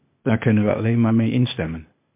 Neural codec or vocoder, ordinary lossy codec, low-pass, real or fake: codec, 16 kHz, 0.8 kbps, ZipCodec; MP3, 32 kbps; 3.6 kHz; fake